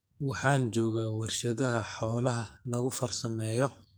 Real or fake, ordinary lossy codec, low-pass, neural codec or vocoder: fake; none; none; codec, 44.1 kHz, 2.6 kbps, SNAC